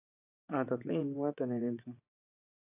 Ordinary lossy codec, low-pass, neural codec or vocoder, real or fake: AAC, 24 kbps; 3.6 kHz; vocoder, 24 kHz, 100 mel bands, Vocos; fake